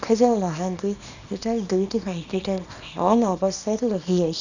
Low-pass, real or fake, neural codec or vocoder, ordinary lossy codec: 7.2 kHz; fake; codec, 24 kHz, 0.9 kbps, WavTokenizer, small release; none